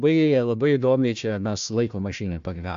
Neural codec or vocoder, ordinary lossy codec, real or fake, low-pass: codec, 16 kHz, 1 kbps, FunCodec, trained on Chinese and English, 50 frames a second; AAC, 64 kbps; fake; 7.2 kHz